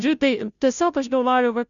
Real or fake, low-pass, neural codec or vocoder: fake; 7.2 kHz; codec, 16 kHz, 0.5 kbps, FunCodec, trained on Chinese and English, 25 frames a second